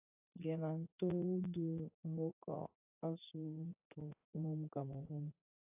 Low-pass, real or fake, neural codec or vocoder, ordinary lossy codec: 3.6 kHz; fake; vocoder, 44.1 kHz, 80 mel bands, Vocos; AAC, 32 kbps